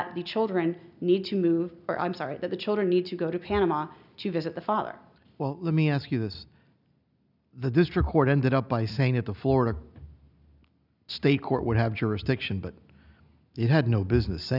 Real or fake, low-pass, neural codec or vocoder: real; 5.4 kHz; none